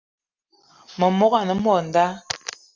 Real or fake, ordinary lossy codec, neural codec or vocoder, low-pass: real; Opus, 32 kbps; none; 7.2 kHz